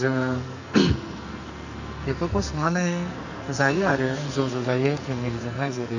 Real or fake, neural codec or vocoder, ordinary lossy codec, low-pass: fake; codec, 44.1 kHz, 2.6 kbps, SNAC; none; 7.2 kHz